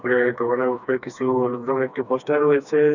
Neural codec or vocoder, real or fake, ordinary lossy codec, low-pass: codec, 16 kHz, 2 kbps, FreqCodec, smaller model; fake; none; 7.2 kHz